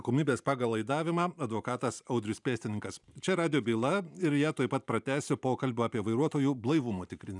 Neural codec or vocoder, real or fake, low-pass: vocoder, 44.1 kHz, 128 mel bands every 512 samples, BigVGAN v2; fake; 10.8 kHz